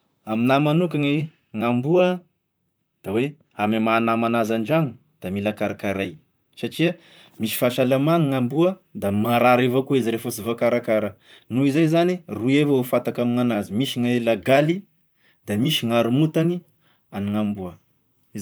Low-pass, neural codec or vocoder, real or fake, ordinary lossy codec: none; vocoder, 44.1 kHz, 128 mel bands, Pupu-Vocoder; fake; none